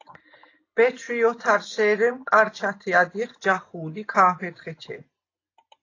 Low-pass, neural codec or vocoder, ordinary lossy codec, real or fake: 7.2 kHz; none; AAC, 32 kbps; real